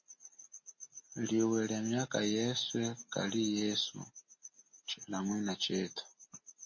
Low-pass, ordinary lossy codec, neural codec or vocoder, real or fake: 7.2 kHz; MP3, 32 kbps; none; real